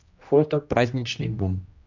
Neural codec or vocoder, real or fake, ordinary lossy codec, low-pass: codec, 16 kHz, 1 kbps, X-Codec, HuBERT features, trained on general audio; fake; AAC, 48 kbps; 7.2 kHz